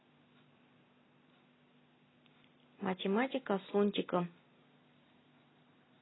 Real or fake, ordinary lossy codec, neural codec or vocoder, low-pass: real; AAC, 16 kbps; none; 7.2 kHz